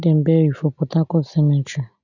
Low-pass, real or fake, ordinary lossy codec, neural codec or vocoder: 7.2 kHz; real; none; none